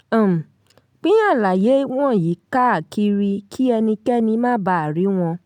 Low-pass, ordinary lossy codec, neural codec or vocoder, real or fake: 19.8 kHz; none; none; real